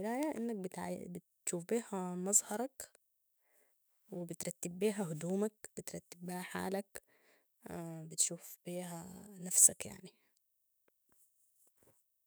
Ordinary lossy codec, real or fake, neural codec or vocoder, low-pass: none; fake; autoencoder, 48 kHz, 128 numbers a frame, DAC-VAE, trained on Japanese speech; none